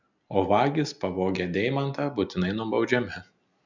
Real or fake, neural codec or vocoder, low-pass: real; none; 7.2 kHz